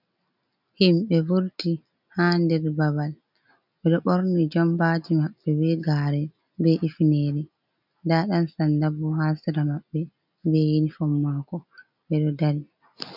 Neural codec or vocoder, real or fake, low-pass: none; real; 5.4 kHz